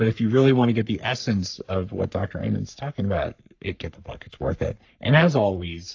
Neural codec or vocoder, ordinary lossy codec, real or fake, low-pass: codec, 44.1 kHz, 3.4 kbps, Pupu-Codec; AAC, 48 kbps; fake; 7.2 kHz